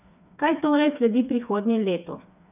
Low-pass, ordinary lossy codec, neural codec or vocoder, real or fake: 3.6 kHz; none; codec, 16 kHz, 4 kbps, FreqCodec, smaller model; fake